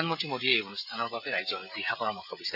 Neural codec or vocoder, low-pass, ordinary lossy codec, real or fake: codec, 16 kHz, 16 kbps, FreqCodec, smaller model; 5.4 kHz; none; fake